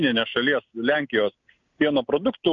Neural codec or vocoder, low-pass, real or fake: none; 7.2 kHz; real